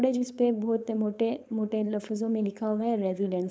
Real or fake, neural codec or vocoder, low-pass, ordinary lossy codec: fake; codec, 16 kHz, 4.8 kbps, FACodec; none; none